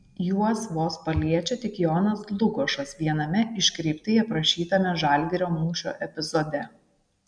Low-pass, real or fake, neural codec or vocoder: 9.9 kHz; real; none